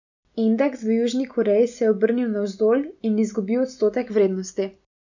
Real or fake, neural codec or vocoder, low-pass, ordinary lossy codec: real; none; 7.2 kHz; none